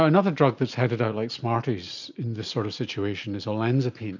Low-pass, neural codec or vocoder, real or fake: 7.2 kHz; none; real